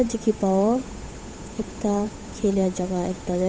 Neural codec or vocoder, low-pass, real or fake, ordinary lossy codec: codec, 16 kHz, 8 kbps, FunCodec, trained on Chinese and English, 25 frames a second; none; fake; none